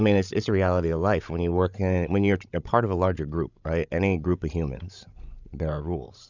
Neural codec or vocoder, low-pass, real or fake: codec, 16 kHz, 16 kbps, FreqCodec, larger model; 7.2 kHz; fake